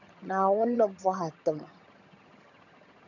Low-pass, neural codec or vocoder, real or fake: 7.2 kHz; vocoder, 22.05 kHz, 80 mel bands, HiFi-GAN; fake